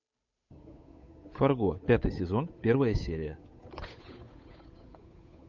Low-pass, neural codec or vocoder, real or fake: 7.2 kHz; codec, 16 kHz, 8 kbps, FunCodec, trained on Chinese and English, 25 frames a second; fake